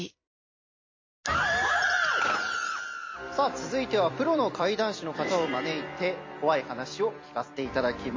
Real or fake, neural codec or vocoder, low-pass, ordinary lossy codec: fake; vocoder, 44.1 kHz, 128 mel bands every 256 samples, BigVGAN v2; 7.2 kHz; MP3, 32 kbps